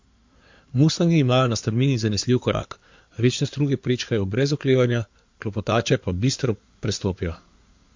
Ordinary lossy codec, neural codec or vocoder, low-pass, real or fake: MP3, 48 kbps; codec, 16 kHz in and 24 kHz out, 2.2 kbps, FireRedTTS-2 codec; 7.2 kHz; fake